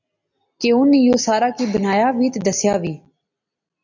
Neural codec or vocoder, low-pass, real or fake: none; 7.2 kHz; real